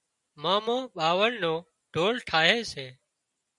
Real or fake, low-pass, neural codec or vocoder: real; 10.8 kHz; none